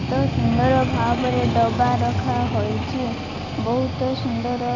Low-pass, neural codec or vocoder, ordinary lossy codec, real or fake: 7.2 kHz; none; none; real